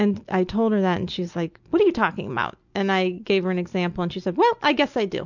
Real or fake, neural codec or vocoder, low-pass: real; none; 7.2 kHz